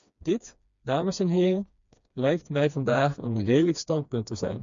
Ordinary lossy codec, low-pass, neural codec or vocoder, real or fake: MP3, 64 kbps; 7.2 kHz; codec, 16 kHz, 2 kbps, FreqCodec, smaller model; fake